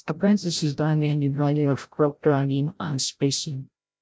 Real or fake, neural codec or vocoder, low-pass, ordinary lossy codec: fake; codec, 16 kHz, 0.5 kbps, FreqCodec, larger model; none; none